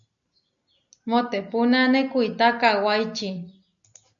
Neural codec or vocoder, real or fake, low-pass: none; real; 7.2 kHz